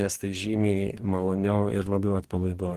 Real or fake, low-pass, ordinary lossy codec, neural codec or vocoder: fake; 14.4 kHz; Opus, 16 kbps; codec, 44.1 kHz, 2.6 kbps, DAC